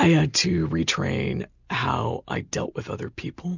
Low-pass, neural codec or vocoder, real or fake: 7.2 kHz; none; real